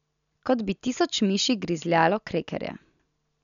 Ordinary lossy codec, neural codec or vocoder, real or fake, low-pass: none; none; real; 7.2 kHz